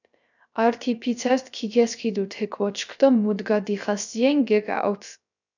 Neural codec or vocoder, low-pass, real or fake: codec, 16 kHz, 0.3 kbps, FocalCodec; 7.2 kHz; fake